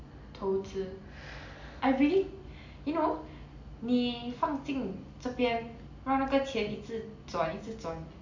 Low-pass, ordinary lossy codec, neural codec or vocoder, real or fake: 7.2 kHz; AAC, 48 kbps; none; real